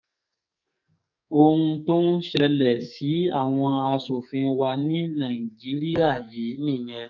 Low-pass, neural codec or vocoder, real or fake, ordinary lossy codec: 7.2 kHz; codec, 44.1 kHz, 2.6 kbps, SNAC; fake; none